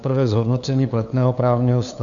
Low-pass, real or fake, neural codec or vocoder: 7.2 kHz; fake; codec, 16 kHz, 2 kbps, FunCodec, trained on LibriTTS, 25 frames a second